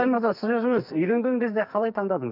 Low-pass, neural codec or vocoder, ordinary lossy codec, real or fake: 5.4 kHz; codec, 44.1 kHz, 2.6 kbps, SNAC; none; fake